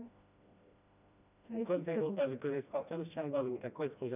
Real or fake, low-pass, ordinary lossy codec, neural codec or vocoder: fake; 3.6 kHz; none; codec, 16 kHz, 1 kbps, FreqCodec, smaller model